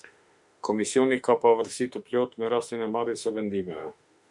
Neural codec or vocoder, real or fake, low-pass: autoencoder, 48 kHz, 32 numbers a frame, DAC-VAE, trained on Japanese speech; fake; 10.8 kHz